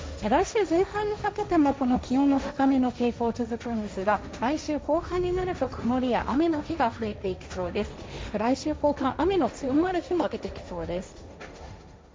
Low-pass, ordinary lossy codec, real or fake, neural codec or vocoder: none; none; fake; codec, 16 kHz, 1.1 kbps, Voila-Tokenizer